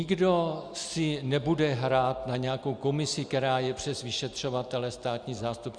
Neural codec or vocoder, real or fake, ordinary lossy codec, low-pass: none; real; Opus, 64 kbps; 9.9 kHz